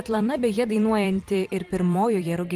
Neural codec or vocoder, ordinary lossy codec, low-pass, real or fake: vocoder, 44.1 kHz, 128 mel bands every 256 samples, BigVGAN v2; Opus, 24 kbps; 14.4 kHz; fake